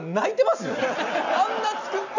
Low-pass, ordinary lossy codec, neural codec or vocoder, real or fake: 7.2 kHz; none; none; real